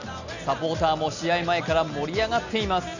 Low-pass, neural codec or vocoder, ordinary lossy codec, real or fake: 7.2 kHz; none; none; real